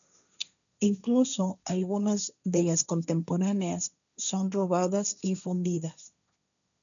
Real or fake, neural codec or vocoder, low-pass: fake; codec, 16 kHz, 1.1 kbps, Voila-Tokenizer; 7.2 kHz